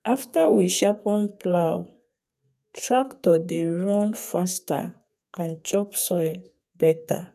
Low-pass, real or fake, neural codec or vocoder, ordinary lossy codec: 14.4 kHz; fake; codec, 32 kHz, 1.9 kbps, SNAC; none